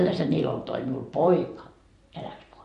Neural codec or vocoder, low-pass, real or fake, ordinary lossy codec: vocoder, 44.1 kHz, 128 mel bands every 512 samples, BigVGAN v2; 14.4 kHz; fake; MP3, 48 kbps